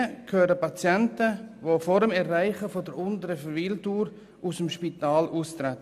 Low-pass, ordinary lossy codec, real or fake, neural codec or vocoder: 14.4 kHz; none; real; none